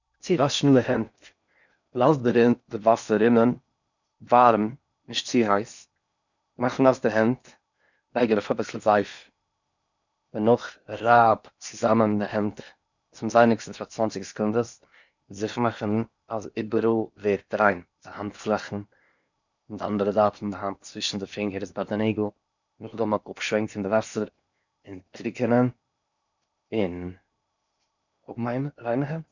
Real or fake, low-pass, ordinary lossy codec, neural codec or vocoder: fake; 7.2 kHz; none; codec, 16 kHz in and 24 kHz out, 0.8 kbps, FocalCodec, streaming, 65536 codes